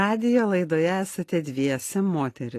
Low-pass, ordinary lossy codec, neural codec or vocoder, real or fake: 14.4 kHz; AAC, 48 kbps; none; real